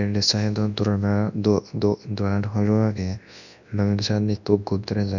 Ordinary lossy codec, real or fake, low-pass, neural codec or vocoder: none; fake; 7.2 kHz; codec, 24 kHz, 0.9 kbps, WavTokenizer, large speech release